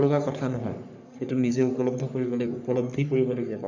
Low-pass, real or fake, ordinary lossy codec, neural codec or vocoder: 7.2 kHz; fake; none; codec, 44.1 kHz, 3.4 kbps, Pupu-Codec